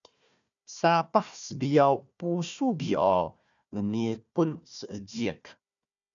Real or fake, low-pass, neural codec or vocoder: fake; 7.2 kHz; codec, 16 kHz, 1 kbps, FunCodec, trained on Chinese and English, 50 frames a second